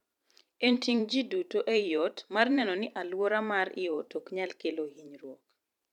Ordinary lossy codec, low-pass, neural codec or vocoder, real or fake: none; 19.8 kHz; vocoder, 44.1 kHz, 128 mel bands every 512 samples, BigVGAN v2; fake